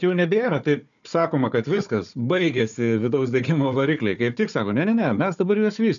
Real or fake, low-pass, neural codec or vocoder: fake; 7.2 kHz; codec, 16 kHz, 4 kbps, FunCodec, trained on Chinese and English, 50 frames a second